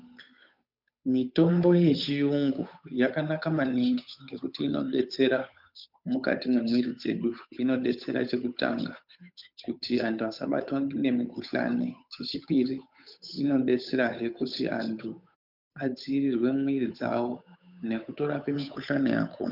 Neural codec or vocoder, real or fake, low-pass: codec, 16 kHz, 8 kbps, FunCodec, trained on Chinese and English, 25 frames a second; fake; 5.4 kHz